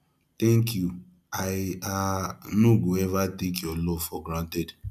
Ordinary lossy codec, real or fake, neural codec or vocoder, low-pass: none; real; none; 14.4 kHz